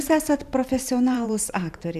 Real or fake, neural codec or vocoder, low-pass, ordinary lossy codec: fake; vocoder, 44.1 kHz, 128 mel bands every 512 samples, BigVGAN v2; 14.4 kHz; MP3, 96 kbps